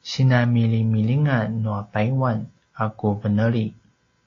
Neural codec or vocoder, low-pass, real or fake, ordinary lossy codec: none; 7.2 kHz; real; AAC, 32 kbps